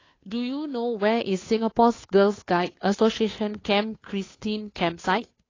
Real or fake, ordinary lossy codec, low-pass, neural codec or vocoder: fake; AAC, 32 kbps; 7.2 kHz; codec, 16 kHz, 2 kbps, FunCodec, trained on Chinese and English, 25 frames a second